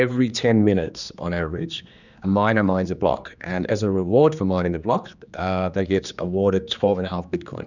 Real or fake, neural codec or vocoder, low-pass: fake; codec, 16 kHz, 2 kbps, X-Codec, HuBERT features, trained on general audio; 7.2 kHz